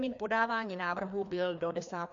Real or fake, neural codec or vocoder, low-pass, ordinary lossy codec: fake; codec, 16 kHz, 2 kbps, FreqCodec, larger model; 7.2 kHz; AAC, 64 kbps